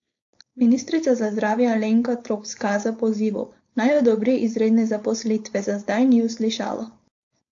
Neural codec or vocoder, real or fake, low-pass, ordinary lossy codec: codec, 16 kHz, 4.8 kbps, FACodec; fake; 7.2 kHz; AAC, 48 kbps